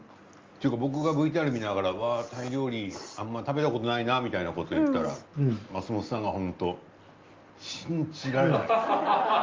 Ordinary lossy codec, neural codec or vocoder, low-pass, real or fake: Opus, 32 kbps; none; 7.2 kHz; real